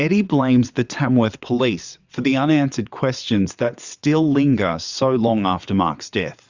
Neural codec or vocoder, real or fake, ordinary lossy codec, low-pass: vocoder, 22.05 kHz, 80 mel bands, WaveNeXt; fake; Opus, 64 kbps; 7.2 kHz